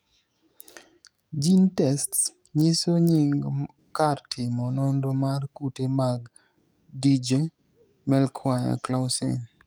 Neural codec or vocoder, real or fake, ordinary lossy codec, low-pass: codec, 44.1 kHz, 7.8 kbps, DAC; fake; none; none